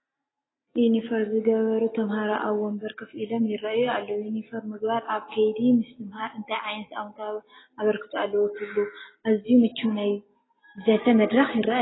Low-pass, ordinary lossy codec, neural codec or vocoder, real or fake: 7.2 kHz; AAC, 16 kbps; none; real